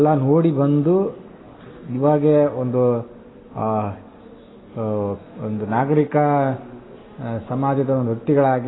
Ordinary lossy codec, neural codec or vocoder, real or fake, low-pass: AAC, 16 kbps; none; real; 7.2 kHz